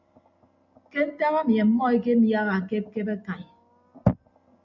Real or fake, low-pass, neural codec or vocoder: real; 7.2 kHz; none